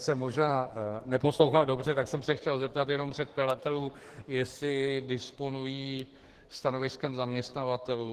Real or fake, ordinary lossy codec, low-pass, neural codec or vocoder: fake; Opus, 16 kbps; 14.4 kHz; codec, 44.1 kHz, 2.6 kbps, SNAC